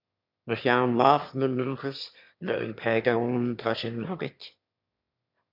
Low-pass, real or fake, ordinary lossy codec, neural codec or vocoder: 5.4 kHz; fake; MP3, 48 kbps; autoencoder, 22.05 kHz, a latent of 192 numbers a frame, VITS, trained on one speaker